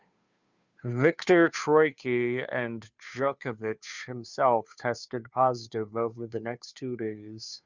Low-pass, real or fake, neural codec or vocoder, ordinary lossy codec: 7.2 kHz; fake; codec, 16 kHz, 2 kbps, FunCodec, trained on Chinese and English, 25 frames a second; Opus, 64 kbps